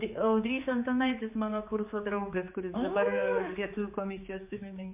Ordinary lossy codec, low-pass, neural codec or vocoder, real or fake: MP3, 32 kbps; 3.6 kHz; codec, 16 kHz, 4 kbps, X-Codec, HuBERT features, trained on general audio; fake